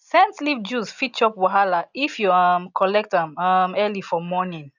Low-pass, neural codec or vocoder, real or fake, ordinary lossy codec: 7.2 kHz; none; real; none